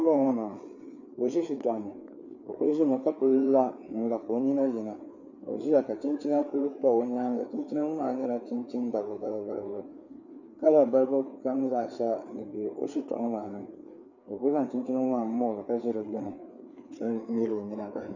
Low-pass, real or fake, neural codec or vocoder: 7.2 kHz; fake; codec, 16 kHz, 4 kbps, FreqCodec, larger model